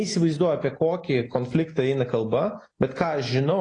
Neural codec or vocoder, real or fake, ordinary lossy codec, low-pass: none; real; AAC, 32 kbps; 9.9 kHz